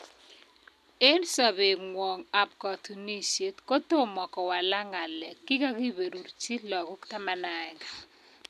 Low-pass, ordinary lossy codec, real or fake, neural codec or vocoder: 14.4 kHz; none; real; none